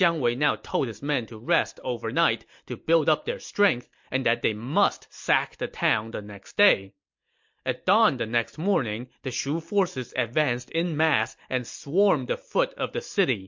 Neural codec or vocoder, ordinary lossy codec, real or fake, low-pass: none; MP3, 48 kbps; real; 7.2 kHz